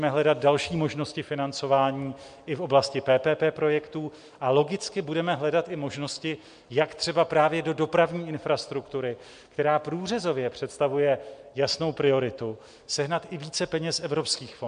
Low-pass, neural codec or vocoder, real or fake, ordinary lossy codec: 9.9 kHz; none; real; MP3, 64 kbps